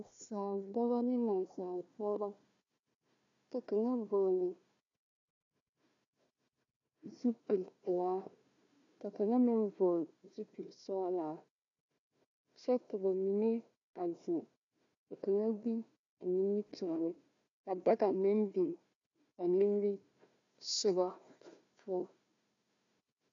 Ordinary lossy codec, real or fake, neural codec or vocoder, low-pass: MP3, 64 kbps; fake; codec, 16 kHz, 1 kbps, FunCodec, trained on Chinese and English, 50 frames a second; 7.2 kHz